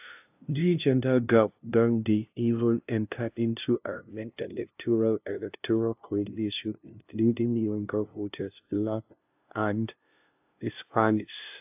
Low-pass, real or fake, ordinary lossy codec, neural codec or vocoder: 3.6 kHz; fake; AAC, 32 kbps; codec, 16 kHz, 0.5 kbps, FunCodec, trained on LibriTTS, 25 frames a second